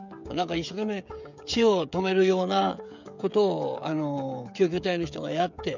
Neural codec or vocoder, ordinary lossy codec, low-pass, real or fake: codec, 16 kHz, 16 kbps, FreqCodec, smaller model; none; 7.2 kHz; fake